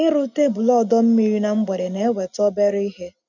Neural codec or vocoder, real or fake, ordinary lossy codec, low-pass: none; real; none; 7.2 kHz